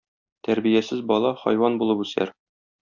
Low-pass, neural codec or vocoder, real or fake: 7.2 kHz; none; real